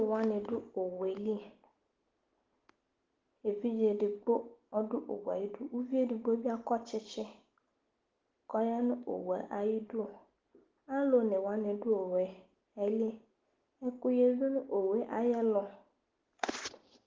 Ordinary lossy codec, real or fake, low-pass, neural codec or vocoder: Opus, 16 kbps; real; 7.2 kHz; none